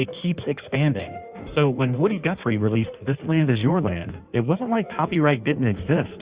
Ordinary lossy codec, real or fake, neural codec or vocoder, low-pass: Opus, 64 kbps; fake; codec, 16 kHz in and 24 kHz out, 1.1 kbps, FireRedTTS-2 codec; 3.6 kHz